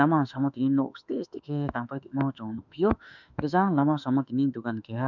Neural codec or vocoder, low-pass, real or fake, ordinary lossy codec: autoencoder, 48 kHz, 32 numbers a frame, DAC-VAE, trained on Japanese speech; 7.2 kHz; fake; none